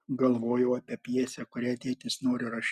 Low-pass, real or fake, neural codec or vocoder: 14.4 kHz; real; none